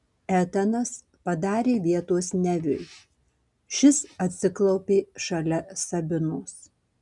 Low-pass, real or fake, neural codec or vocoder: 10.8 kHz; real; none